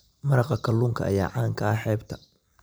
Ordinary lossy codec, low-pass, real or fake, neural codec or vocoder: none; none; real; none